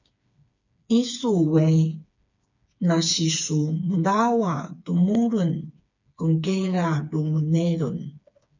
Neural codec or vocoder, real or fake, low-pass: codec, 16 kHz, 4 kbps, FreqCodec, smaller model; fake; 7.2 kHz